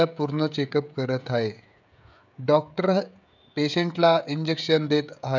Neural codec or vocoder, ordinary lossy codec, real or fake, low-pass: codec, 16 kHz, 16 kbps, FreqCodec, smaller model; none; fake; 7.2 kHz